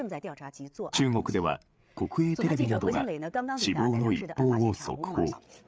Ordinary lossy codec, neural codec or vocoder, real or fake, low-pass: none; codec, 16 kHz, 8 kbps, FreqCodec, larger model; fake; none